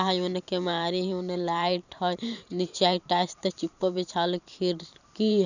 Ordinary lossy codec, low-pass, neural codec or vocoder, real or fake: none; 7.2 kHz; vocoder, 44.1 kHz, 128 mel bands every 512 samples, BigVGAN v2; fake